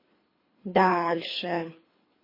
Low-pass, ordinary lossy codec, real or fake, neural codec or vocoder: 5.4 kHz; MP3, 24 kbps; fake; codec, 24 kHz, 3 kbps, HILCodec